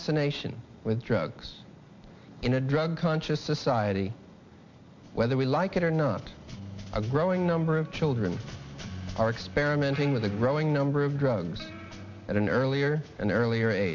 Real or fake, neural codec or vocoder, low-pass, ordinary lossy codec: real; none; 7.2 kHz; MP3, 48 kbps